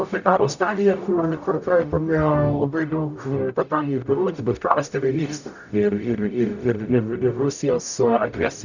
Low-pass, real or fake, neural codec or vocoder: 7.2 kHz; fake; codec, 44.1 kHz, 0.9 kbps, DAC